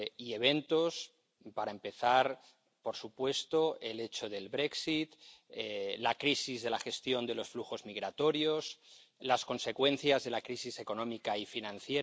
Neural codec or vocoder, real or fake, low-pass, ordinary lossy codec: none; real; none; none